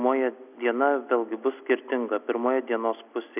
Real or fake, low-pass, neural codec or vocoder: real; 3.6 kHz; none